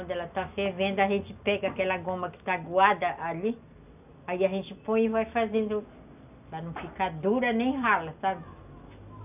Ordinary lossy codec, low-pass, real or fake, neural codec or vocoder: none; 3.6 kHz; real; none